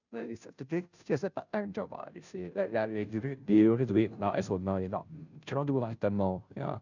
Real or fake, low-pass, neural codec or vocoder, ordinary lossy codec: fake; 7.2 kHz; codec, 16 kHz, 0.5 kbps, FunCodec, trained on Chinese and English, 25 frames a second; none